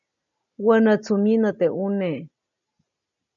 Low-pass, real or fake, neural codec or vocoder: 7.2 kHz; real; none